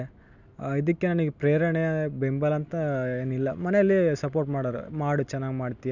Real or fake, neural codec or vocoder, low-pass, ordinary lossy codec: real; none; 7.2 kHz; none